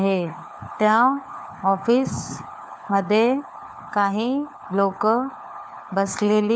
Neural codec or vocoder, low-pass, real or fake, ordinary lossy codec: codec, 16 kHz, 4 kbps, FunCodec, trained on LibriTTS, 50 frames a second; none; fake; none